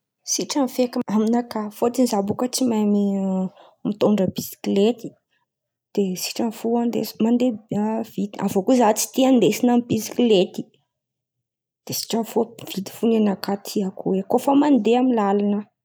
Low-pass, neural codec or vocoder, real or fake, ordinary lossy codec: none; none; real; none